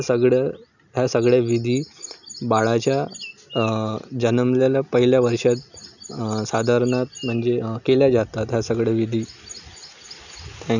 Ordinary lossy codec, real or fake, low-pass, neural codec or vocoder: none; real; 7.2 kHz; none